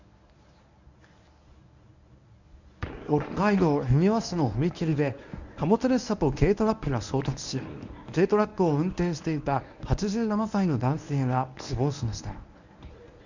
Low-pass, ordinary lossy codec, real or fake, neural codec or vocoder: 7.2 kHz; none; fake; codec, 24 kHz, 0.9 kbps, WavTokenizer, medium speech release version 1